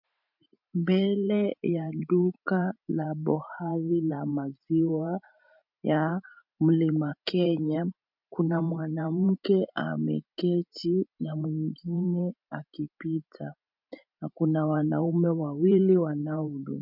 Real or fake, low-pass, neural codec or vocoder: fake; 5.4 kHz; vocoder, 44.1 kHz, 128 mel bands every 512 samples, BigVGAN v2